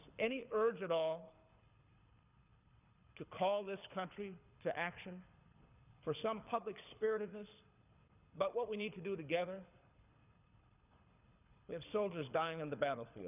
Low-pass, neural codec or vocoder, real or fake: 3.6 kHz; codec, 24 kHz, 6 kbps, HILCodec; fake